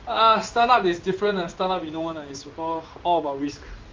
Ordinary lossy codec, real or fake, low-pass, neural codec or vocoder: Opus, 32 kbps; fake; 7.2 kHz; codec, 16 kHz in and 24 kHz out, 1 kbps, XY-Tokenizer